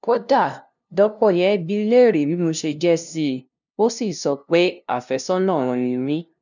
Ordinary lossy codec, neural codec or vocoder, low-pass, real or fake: none; codec, 16 kHz, 0.5 kbps, FunCodec, trained on LibriTTS, 25 frames a second; 7.2 kHz; fake